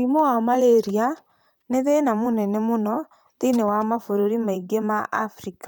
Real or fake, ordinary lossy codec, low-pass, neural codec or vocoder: fake; none; none; vocoder, 44.1 kHz, 128 mel bands, Pupu-Vocoder